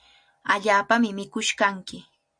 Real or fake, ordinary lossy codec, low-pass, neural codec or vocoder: real; MP3, 64 kbps; 9.9 kHz; none